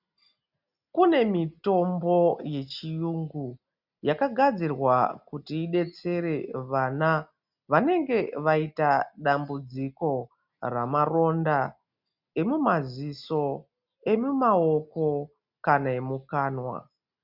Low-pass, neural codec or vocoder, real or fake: 5.4 kHz; none; real